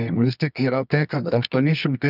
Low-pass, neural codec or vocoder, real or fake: 5.4 kHz; codec, 24 kHz, 0.9 kbps, WavTokenizer, medium music audio release; fake